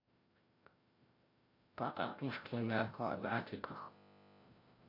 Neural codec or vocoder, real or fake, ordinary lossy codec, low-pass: codec, 16 kHz, 0.5 kbps, FreqCodec, larger model; fake; MP3, 32 kbps; 5.4 kHz